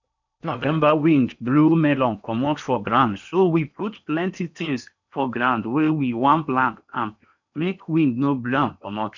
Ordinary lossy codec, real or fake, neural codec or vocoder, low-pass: none; fake; codec, 16 kHz in and 24 kHz out, 0.8 kbps, FocalCodec, streaming, 65536 codes; 7.2 kHz